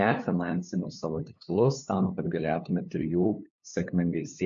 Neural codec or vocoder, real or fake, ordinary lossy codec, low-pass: codec, 16 kHz, 2 kbps, FunCodec, trained on LibriTTS, 25 frames a second; fake; MP3, 64 kbps; 7.2 kHz